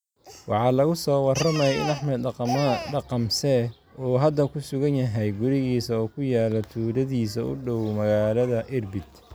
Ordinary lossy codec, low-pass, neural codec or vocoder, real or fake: none; none; none; real